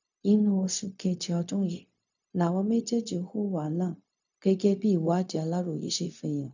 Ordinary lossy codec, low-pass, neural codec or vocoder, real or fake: none; 7.2 kHz; codec, 16 kHz, 0.4 kbps, LongCat-Audio-Codec; fake